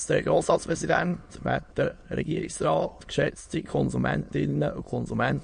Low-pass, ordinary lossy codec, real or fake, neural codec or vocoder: 9.9 kHz; MP3, 48 kbps; fake; autoencoder, 22.05 kHz, a latent of 192 numbers a frame, VITS, trained on many speakers